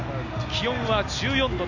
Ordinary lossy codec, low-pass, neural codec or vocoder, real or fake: none; 7.2 kHz; none; real